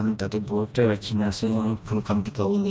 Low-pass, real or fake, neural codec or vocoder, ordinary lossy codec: none; fake; codec, 16 kHz, 1 kbps, FreqCodec, smaller model; none